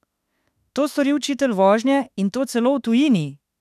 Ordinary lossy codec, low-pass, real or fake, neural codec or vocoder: none; 14.4 kHz; fake; autoencoder, 48 kHz, 32 numbers a frame, DAC-VAE, trained on Japanese speech